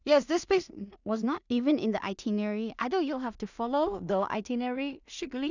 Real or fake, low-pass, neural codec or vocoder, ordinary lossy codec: fake; 7.2 kHz; codec, 16 kHz in and 24 kHz out, 0.4 kbps, LongCat-Audio-Codec, two codebook decoder; none